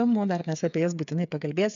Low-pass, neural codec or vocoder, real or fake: 7.2 kHz; codec, 16 kHz, 8 kbps, FreqCodec, smaller model; fake